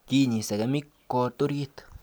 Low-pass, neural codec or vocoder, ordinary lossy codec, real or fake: none; none; none; real